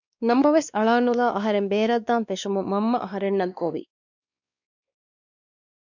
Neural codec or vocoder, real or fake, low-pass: codec, 16 kHz, 2 kbps, X-Codec, WavLM features, trained on Multilingual LibriSpeech; fake; 7.2 kHz